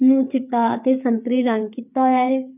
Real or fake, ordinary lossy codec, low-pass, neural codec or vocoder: fake; none; 3.6 kHz; codec, 16 kHz, 4 kbps, FreqCodec, larger model